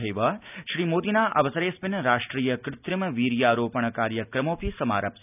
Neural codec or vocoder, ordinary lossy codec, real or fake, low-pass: none; none; real; 3.6 kHz